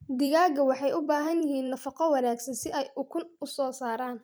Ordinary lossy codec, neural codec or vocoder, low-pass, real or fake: none; vocoder, 44.1 kHz, 128 mel bands every 256 samples, BigVGAN v2; none; fake